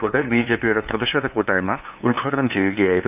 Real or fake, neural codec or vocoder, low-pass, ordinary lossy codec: fake; codec, 16 kHz, 2 kbps, FunCodec, trained on LibriTTS, 25 frames a second; 3.6 kHz; none